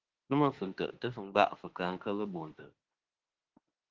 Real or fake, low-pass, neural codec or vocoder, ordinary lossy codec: fake; 7.2 kHz; autoencoder, 48 kHz, 32 numbers a frame, DAC-VAE, trained on Japanese speech; Opus, 16 kbps